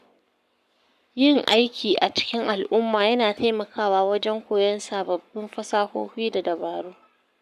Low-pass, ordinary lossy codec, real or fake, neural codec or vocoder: 14.4 kHz; none; fake; codec, 44.1 kHz, 7.8 kbps, Pupu-Codec